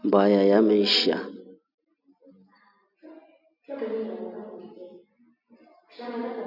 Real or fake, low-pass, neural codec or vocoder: real; 5.4 kHz; none